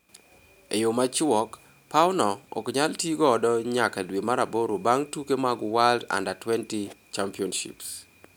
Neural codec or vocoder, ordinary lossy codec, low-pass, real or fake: none; none; none; real